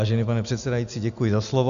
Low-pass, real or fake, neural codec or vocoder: 7.2 kHz; real; none